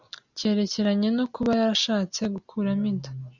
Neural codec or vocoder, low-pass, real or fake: none; 7.2 kHz; real